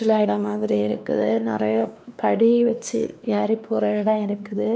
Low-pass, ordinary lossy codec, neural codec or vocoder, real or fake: none; none; codec, 16 kHz, 2 kbps, X-Codec, WavLM features, trained on Multilingual LibriSpeech; fake